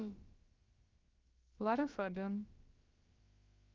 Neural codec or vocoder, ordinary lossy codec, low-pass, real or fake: codec, 16 kHz, about 1 kbps, DyCAST, with the encoder's durations; Opus, 24 kbps; 7.2 kHz; fake